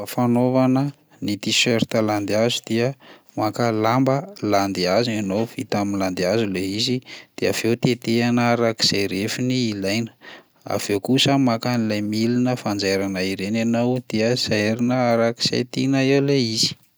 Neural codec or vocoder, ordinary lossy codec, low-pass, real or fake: none; none; none; real